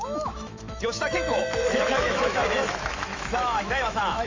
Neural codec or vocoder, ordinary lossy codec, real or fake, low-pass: autoencoder, 48 kHz, 128 numbers a frame, DAC-VAE, trained on Japanese speech; MP3, 48 kbps; fake; 7.2 kHz